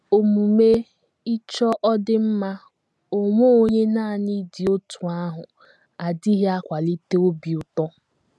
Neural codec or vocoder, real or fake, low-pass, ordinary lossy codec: none; real; none; none